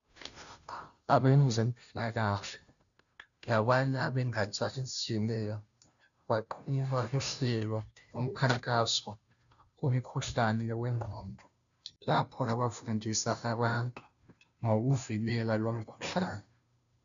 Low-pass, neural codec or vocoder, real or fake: 7.2 kHz; codec, 16 kHz, 0.5 kbps, FunCodec, trained on Chinese and English, 25 frames a second; fake